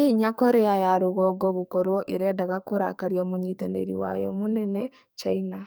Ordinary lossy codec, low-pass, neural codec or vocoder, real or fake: none; none; codec, 44.1 kHz, 2.6 kbps, SNAC; fake